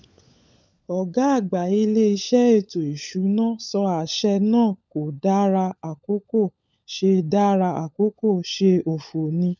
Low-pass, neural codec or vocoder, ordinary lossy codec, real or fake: none; codec, 16 kHz, 16 kbps, FunCodec, trained on LibriTTS, 50 frames a second; none; fake